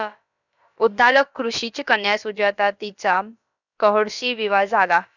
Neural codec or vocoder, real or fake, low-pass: codec, 16 kHz, about 1 kbps, DyCAST, with the encoder's durations; fake; 7.2 kHz